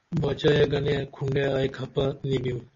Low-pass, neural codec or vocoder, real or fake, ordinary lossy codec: 7.2 kHz; none; real; MP3, 32 kbps